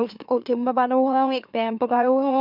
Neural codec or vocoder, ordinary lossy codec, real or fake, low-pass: autoencoder, 44.1 kHz, a latent of 192 numbers a frame, MeloTTS; none; fake; 5.4 kHz